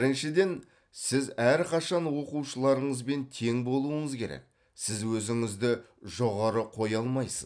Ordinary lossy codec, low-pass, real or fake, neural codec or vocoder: none; 9.9 kHz; real; none